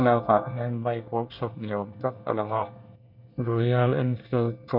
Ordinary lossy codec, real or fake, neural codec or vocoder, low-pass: none; fake; codec, 24 kHz, 1 kbps, SNAC; 5.4 kHz